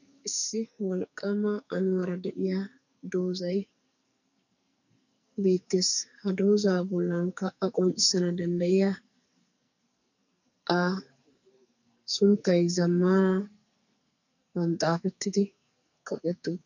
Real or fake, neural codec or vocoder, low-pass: fake; codec, 44.1 kHz, 2.6 kbps, SNAC; 7.2 kHz